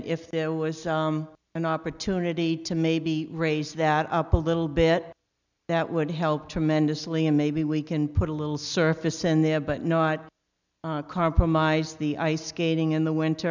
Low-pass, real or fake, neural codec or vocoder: 7.2 kHz; real; none